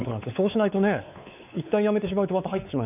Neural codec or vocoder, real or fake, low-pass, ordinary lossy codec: codec, 16 kHz, 4 kbps, X-Codec, WavLM features, trained on Multilingual LibriSpeech; fake; 3.6 kHz; none